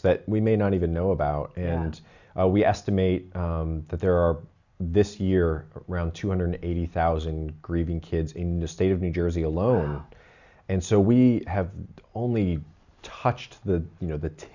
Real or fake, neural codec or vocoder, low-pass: real; none; 7.2 kHz